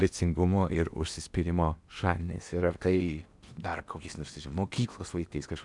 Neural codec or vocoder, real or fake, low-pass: codec, 16 kHz in and 24 kHz out, 0.8 kbps, FocalCodec, streaming, 65536 codes; fake; 10.8 kHz